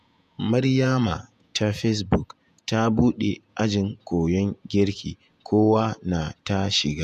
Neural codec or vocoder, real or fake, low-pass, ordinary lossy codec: vocoder, 48 kHz, 128 mel bands, Vocos; fake; 14.4 kHz; none